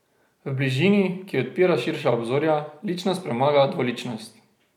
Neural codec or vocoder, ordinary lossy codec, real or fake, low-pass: none; none; real; 19.8 kHz